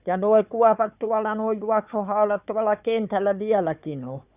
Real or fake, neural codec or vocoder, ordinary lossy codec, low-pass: fake; codec, 16 kHz, 4 kbps, FunCodec, trained on Chinese and English, 50 frames a second; none; 3.6 kHz